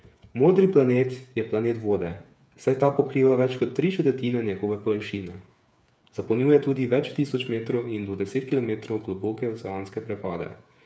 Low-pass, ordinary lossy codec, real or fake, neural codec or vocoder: none; none; fake; codec, 16 kHz, 8 kbps, FreqCodec, smaller model